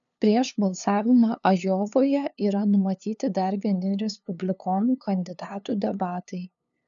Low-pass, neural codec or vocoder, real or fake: 7.2 kHz; codec, 16 kHz, 2 kbps, FunCodec, trained on LibriTTS, 25 frames a second; fake